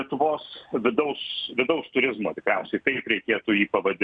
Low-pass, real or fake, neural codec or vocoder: 9.9 kHz; real; none